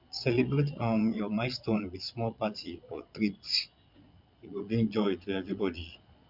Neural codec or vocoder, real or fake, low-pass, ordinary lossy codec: vocoder, 22.05 kHz, 80 mel bands, Vocos; fake; 5.4 kHz; none